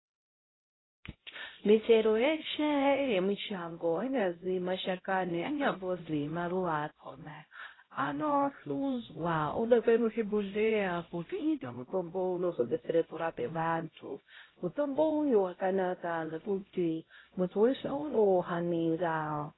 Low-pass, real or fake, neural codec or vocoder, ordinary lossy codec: 7.2 kHz; fake; codec, 16 kHz, 0.5 kbps, X-Codec, HuBERT features, trained on LibriSpeech; AAC, 16 kbps